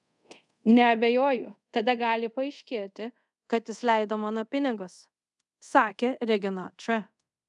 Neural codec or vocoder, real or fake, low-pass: codec, 24 kHz, 0.5 kbps, DualCodec; fake; 10.8 kHz